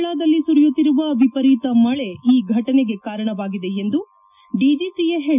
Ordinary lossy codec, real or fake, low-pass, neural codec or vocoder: none; real; 3.6 kHz; none